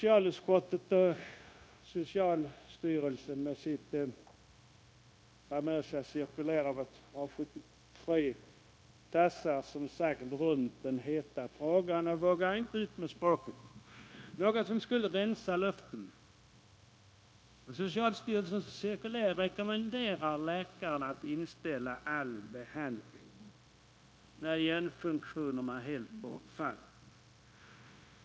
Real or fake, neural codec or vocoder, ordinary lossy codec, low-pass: fake; codec, 16 kHz, 0.9 kbps, LongCat-Audio-Codec; none; none